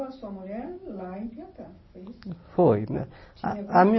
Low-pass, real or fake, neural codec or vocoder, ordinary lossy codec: 7.2 kHz; real; none; MP3, 24 kbps